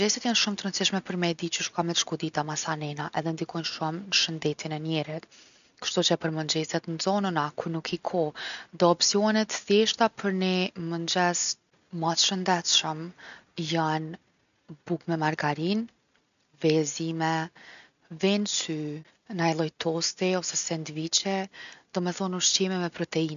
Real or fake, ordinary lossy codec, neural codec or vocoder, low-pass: real; none; none; 7.2 kHz